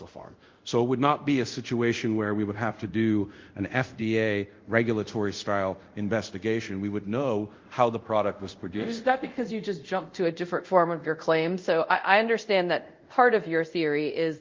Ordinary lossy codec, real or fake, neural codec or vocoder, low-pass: Opus, 32 kbps; fake; codec, 24 kHz, 0.5 kbps, DualCodec; 7.2 kHz